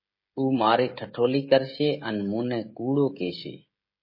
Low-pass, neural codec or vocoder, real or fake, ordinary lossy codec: 5.4 kHz; codec, 16 kHz, 16 kbps, FreqCodec, smaller model; fake; MP3, 24 kbps